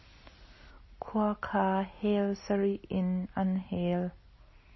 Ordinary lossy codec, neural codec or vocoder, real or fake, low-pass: MP3, 24 kbps; none; real; 7.2 kHz